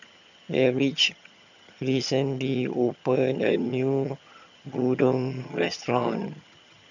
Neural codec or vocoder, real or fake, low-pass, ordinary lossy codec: vocoder, 22.05 kHz, 80 mel bands, HiFi-GAN; fake; 7.2 kHz; none